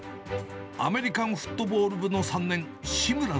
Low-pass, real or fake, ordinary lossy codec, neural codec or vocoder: none; real; none; none